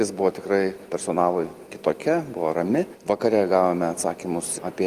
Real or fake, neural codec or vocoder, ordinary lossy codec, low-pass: real; none; Opus, 32 kbps; 14.4 kHz